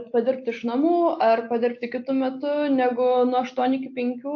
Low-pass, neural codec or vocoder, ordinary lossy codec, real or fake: 7.2 kHz; none; AAC, 48 kbps; real